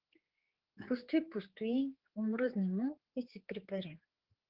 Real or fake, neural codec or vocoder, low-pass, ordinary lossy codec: fake; codec, 16 kHz, 4 kbps, X-Codec, HuBERT features, trained on general audio; 5.4 kHz; Opus, 16 kbps